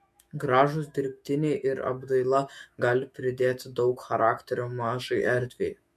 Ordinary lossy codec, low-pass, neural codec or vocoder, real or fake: MP3, 64 kbps; 14.4 kHz; autoencoder, 48 kHz, 128 numbers a frame, DAC-VAE, trained on Japanese speech; fake